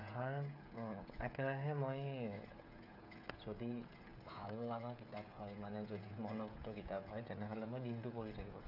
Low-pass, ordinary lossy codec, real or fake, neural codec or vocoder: 5.4 kHz; MP3, 48 kbps; fake; codec, 16 kHz, 16 kbps, FreqCodec, smaller model